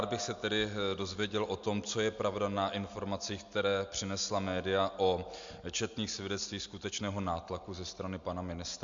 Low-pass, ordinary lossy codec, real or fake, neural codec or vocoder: 7.2 kHz; MP3, 64 kbps; real; none